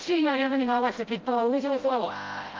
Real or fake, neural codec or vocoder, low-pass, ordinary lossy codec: fake; codec, 16 kHz, 0.5 kbps, FreqCodec, smaller model; 7.2 kHz; Opus, 24 kbps